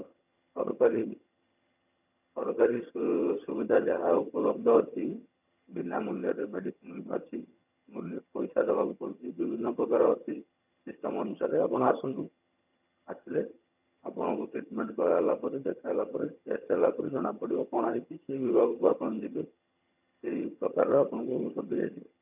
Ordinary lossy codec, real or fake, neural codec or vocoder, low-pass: none; fake; vocoder, 22.05 kHz, 80 mel bands, HiFi-GAN; 3.6 kHz